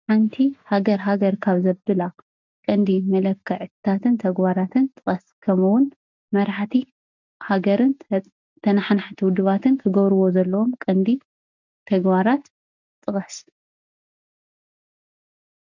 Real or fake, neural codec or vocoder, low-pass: real; none; 7.2 kHz